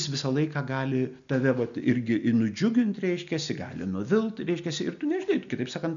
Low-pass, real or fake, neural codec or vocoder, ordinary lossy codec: 7.2 kHz; real; none; MP3, 96 kbps